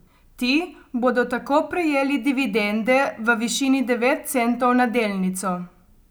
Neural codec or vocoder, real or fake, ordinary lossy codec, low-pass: none; real; none; none